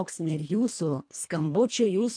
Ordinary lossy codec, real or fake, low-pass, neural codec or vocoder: MP3, 64 kbps; fake; 9.9 kHz; codec, 24 kHz, 1.5 kbps, HILCodec